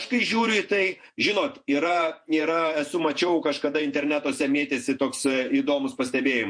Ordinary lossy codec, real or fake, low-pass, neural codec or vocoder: MP3, 48 kbps; fake; 9.9 kHz; vocoder, 48 kHz, 128 mel bands, Vocos